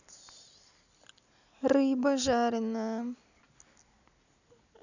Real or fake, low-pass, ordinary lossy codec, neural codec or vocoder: real; 7.2 kHz; none; none